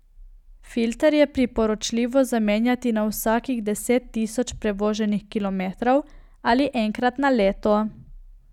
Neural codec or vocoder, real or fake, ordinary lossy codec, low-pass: none; real; none; 19.8 kHz